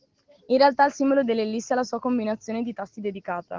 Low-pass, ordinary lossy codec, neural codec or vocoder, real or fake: 7.2 kHz; Opus, 16 kbps; none; real